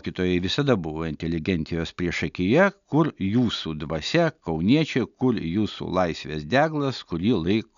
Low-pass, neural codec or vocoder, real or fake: 7.2 kHz; none; real